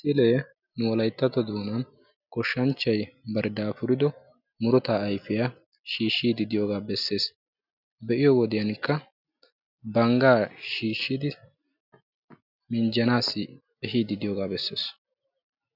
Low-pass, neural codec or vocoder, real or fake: 5.4 kHz; none; real